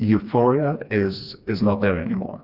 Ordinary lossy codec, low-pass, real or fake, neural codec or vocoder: Opus, 64 kbps; 5.4 kHz; fake; codec, 16 kHz, 2 kbps, FreqCodec, smaller model